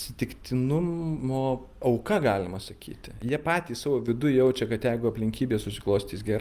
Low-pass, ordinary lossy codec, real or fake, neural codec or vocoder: 14.4 kHz; Opus, 32 kbps; real; none